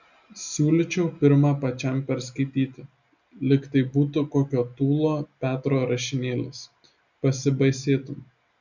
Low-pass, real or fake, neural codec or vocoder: 7.2 kHz; real; none